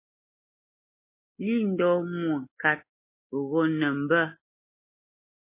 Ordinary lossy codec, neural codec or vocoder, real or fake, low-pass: MP3, 24 kbps; none; real; 3.6 kHz